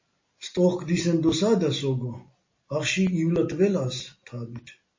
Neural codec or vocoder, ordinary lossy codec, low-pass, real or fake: none; MP3, 32 kbps; 7.2 kHz; real